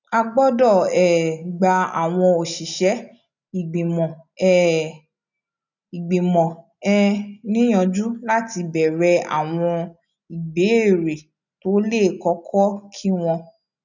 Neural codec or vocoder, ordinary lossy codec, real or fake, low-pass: none; none; real; 7.2 kHz